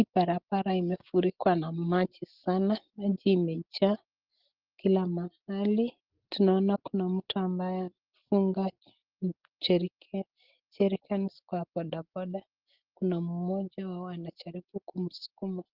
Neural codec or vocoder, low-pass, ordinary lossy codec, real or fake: none; 5.4 kHz; Opus, 16 kbps; real